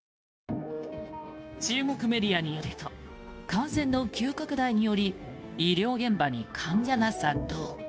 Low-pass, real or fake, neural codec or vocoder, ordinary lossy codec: none; fake; codec, 16 kHz, 0.9 kbps, LongCat-Audio-Codec; none